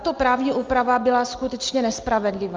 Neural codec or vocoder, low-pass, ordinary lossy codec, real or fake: none; 7.2 kHz; Opus, 32 kbps; real